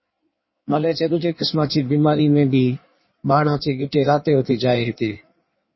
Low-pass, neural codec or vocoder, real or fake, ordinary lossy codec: 7.2 kHz; codec, 16 kHz in and 24 kHz out, 1.1 kbps, FireRedTTS-2 codec; fake; MP3, 24 kbps